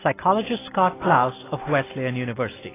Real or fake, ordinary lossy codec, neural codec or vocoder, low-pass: real; AAC, 16 kbps; none; 3.6 kHz